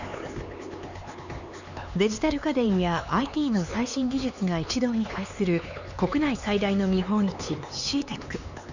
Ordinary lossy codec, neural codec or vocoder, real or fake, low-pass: none; codec, 16 kHz, 4 kbps, X-Codec, HuBERT features, trained on LibriSpeech; fake; 7.2 kHz